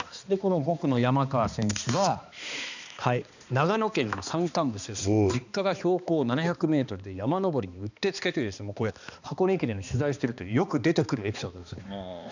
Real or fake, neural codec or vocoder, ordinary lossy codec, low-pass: fake; codec, 16 kHz, 2 kbps, X-Codec, HuBERT features, trained on balanced general audio; none; 7.2 kHz